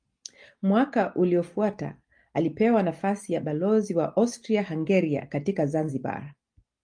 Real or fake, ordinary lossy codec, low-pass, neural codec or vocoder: real; Opus, 32 kbps; 9.9 kHz; none